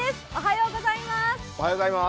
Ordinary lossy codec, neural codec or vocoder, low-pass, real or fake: none; none; none; real